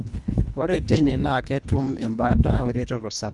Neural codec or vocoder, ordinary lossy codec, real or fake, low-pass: codec, 24 kHz, 1.5 kbps, HILCodec; none; fake; 10.8 kHz